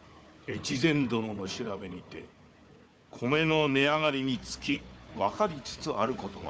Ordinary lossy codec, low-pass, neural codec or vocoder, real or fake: none; none; codec, 16 kHz, 4 kbps, FunCodec, trained on Chinese and English, 50 frames a second; fake